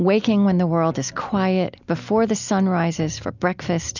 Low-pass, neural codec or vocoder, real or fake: 7.2 kHz; none; real